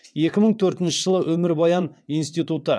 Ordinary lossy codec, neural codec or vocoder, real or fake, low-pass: none; vocoder, 22.05 kHz, 80 mel bands, WaveNeXt; fake; none